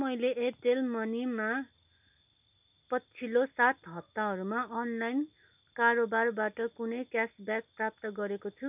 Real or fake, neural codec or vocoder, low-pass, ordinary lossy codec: real; none; 3.6 kHz; none